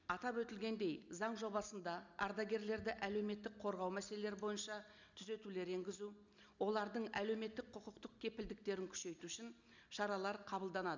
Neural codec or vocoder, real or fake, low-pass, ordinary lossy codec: none; real; 7.2 kHz; none